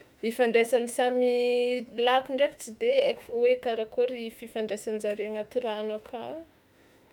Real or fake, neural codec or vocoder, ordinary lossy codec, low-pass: fake; autoencoder, 48 kHz, 32 numbers a frame, DAC-VAE, trained on Japanese speech; none; 19.8 kHz